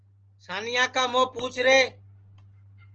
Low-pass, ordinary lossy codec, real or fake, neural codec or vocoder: 7.2 kHz; Opus, 16 kbps; real; none